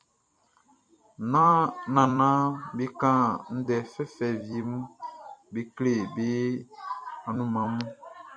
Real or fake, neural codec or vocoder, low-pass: fake; vocoder, 44.1 kHz, 128 mel bands every 256 samples, BigVGAN v2; 9.9 kHz